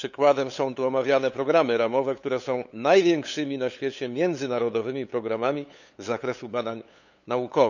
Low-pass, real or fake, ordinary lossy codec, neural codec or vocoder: 7.2 kHz; fake; none; codec, 16 kHz, 8 kbps, FunCodec, trained on LibriTTS, 25 frames a second